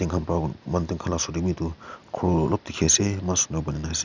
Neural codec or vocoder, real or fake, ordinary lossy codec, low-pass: none; real; none; 7.2 kHz